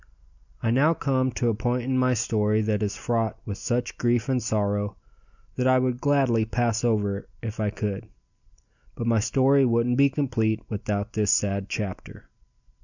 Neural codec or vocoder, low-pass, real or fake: none; 7.2 kHz; real